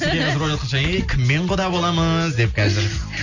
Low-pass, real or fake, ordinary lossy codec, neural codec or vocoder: 7.2 kHz; real; none; none